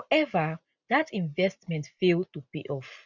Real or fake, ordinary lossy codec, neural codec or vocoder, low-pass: real; none; none; 7.2 kHz